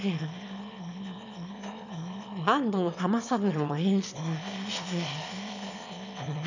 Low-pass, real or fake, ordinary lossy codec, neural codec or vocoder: 7.2 kHz; fake; none; autoencoder, 22.05 kHz, a latent of 192 numbers a frame, VITS, trained on one speaker